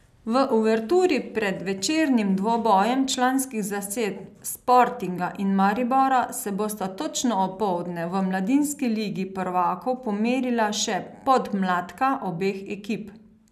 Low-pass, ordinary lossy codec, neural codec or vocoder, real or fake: 14.4 kHz; none; none; real